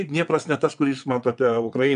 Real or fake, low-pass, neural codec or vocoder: fake; 9.9 kHz; vocoder, 22.05 kHz, 80 mel bands, WaveNeXt